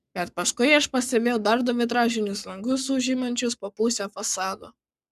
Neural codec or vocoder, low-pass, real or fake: codec, 44.1 kHz, 7.8 kbps, Pupu-Codec; 14.4 kHz; fake